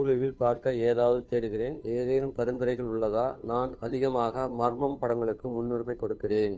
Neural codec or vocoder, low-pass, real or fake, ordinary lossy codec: codec, 16 kHz, 2 kbps, FunCodec, trained on Chinese and English, 25 frames a second; none; fake; none